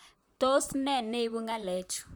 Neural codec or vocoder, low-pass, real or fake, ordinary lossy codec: vocoder, 44.1 kHz, 128 mel bands, Pupu-Vocoder; none; fake; none